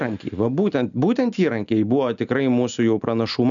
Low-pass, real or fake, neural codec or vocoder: 7.2 kHz; real; none